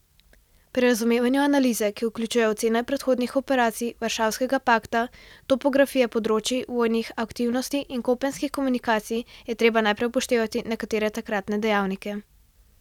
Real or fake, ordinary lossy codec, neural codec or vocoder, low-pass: real; none; none; 19.8 kHz